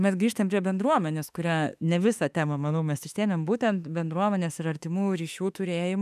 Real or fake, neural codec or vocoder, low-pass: fake; autoencoder, 48 kHz, 32 numbers a frame, DAC-VAE, trained on Japanese speech; 14.4 kHz